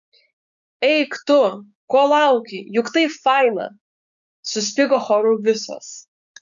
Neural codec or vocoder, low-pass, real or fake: codec, 16 kHz, 6 kbps, DAC; 7.2 kHz; fake